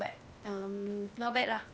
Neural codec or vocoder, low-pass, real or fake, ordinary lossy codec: codec, 16 kHz, 0.8 kbps, ZipCodec; none; fake; none